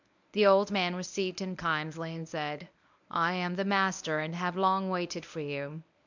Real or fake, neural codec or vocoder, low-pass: fake; codec, 24 kHz, 0.9 kbps, WavTokenizer, medium speech release version 1; 7.2 kHz